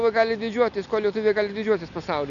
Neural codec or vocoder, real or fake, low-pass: none; real; 7.2 kHz